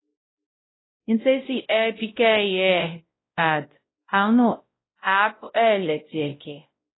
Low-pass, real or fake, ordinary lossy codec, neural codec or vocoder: 7.2 kHz; fake; AAC, 16 kbps; codec, 16 kHz, 0.5 kbps, X-Codec, WavLM features, trained on Multilingual LibriSpeech